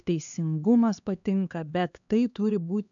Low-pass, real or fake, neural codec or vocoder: 7.2 kHz; fake; codec, 16 kHz, 2 kbps, X-Codec, HuBERT features, trained on LibriSpeech